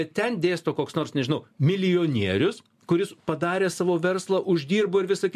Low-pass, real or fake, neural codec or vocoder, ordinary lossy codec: 14.4 kHz; real; none; MP3, 64 kbps